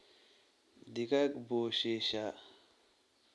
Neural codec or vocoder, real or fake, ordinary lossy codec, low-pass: none; real; none; none